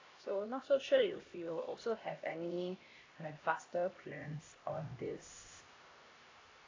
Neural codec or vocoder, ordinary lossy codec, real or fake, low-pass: codec, 16 kHz, 1 kbps, X-Codec, HuBERT features, trained on LibriSpeech; AAC, 32 kbps; fake; 7.2 kHz